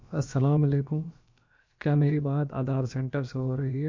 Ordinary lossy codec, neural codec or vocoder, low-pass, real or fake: MP3, 48 kbps; codec, 16 kHz, about 1 kbps, DyCAST, with the encoder's durations; 7.2 kHz; fake